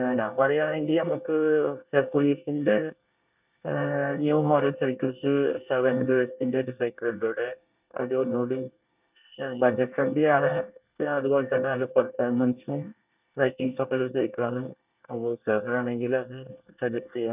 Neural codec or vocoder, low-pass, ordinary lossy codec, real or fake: codec, 24 kHz, 1 kbps, SNAC; 3.6 kHz; none; fake